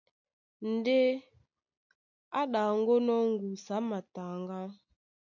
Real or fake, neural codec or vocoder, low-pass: real; none; 7.2 kHz